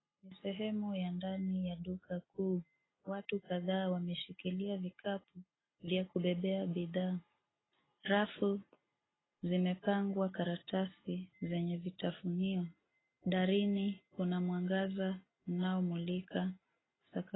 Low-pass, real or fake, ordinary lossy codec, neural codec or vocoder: 7.2 kHz; real; AAC, 16 kbps; none